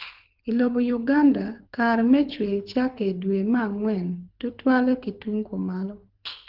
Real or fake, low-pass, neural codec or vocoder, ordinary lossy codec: fake; 5.4 kHz; codec, 24 kHz, 6 kbps, HILCodec; Opus, 24 kbps